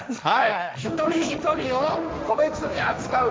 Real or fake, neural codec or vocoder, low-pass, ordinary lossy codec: fake; codec, 16 kHz, 1.1 kbps, Voila-Tokenizer; none; none